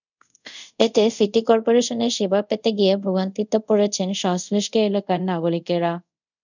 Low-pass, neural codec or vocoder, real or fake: 7.2 kHz; codec, 24 kHz, 0.5 kbps, DualCodec; fake